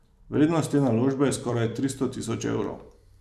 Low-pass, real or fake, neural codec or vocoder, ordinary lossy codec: 14.4 kHz; fake; vocoder, 44.1 kHz, 128 mel bands every 256 samples, BigVGAN v2; none